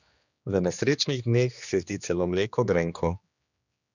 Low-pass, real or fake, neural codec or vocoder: 7.2 kHz; fake; codec, 16 kHz, 2 kbps, X-Codec, HuBERT features, trained on general audio